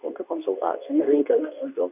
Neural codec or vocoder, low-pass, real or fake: codec, 24 kHz, 0.9 kbps, WavTokenizer, medium speech release version 2; 3.6 kHz; fake